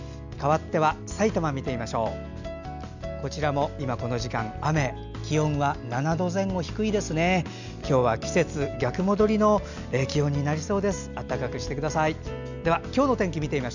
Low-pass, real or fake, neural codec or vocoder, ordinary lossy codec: 7.2 kHz; real; none; none